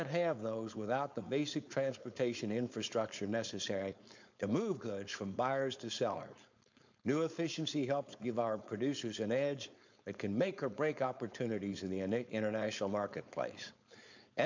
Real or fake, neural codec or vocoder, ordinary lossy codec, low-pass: fake; codec, 16 kHz, 4.8 kbps, FACodec; MP3, 64 kbps; 7.2 kHz